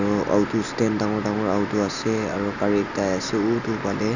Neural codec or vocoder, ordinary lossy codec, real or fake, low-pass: none; none; real; 7.2 kHz